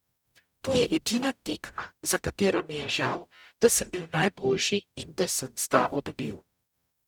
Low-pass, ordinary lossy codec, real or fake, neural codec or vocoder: 19.8 kHz; none; fake; codec, 44.1 kHz, 0.9 kbps, DAC